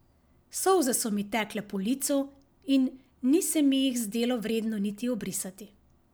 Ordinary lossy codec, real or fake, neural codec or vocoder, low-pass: none; real; none; none